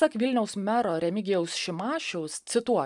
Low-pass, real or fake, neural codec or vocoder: 10.8 kHz; real; none